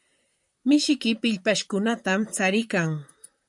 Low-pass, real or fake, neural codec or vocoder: 10.8 kHz; fake; vocoder, 44.1 kHz, 128 mel bands, Pupu-Vocoder